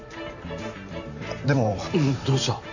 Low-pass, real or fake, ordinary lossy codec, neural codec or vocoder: 7.2 kHz; fake; none; vocoder, 44.1 kHz, 128 mel bands every 512 samples, BigVGAN v2